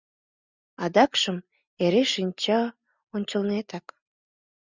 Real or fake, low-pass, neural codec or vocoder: real; 7.2 kHz; none